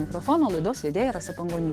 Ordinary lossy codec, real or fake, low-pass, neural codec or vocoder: Opus, 16 kbps; fake; 14.4 kHz; autoencoder, 48 kHz, 128 numbers a frame, DAC-VAE, trained on Japanese speech